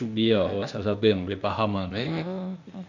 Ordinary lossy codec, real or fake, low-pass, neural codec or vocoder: none; fake; 7.2 kHz; codec, 16 kHz, 0.8 kbps, ZipCodec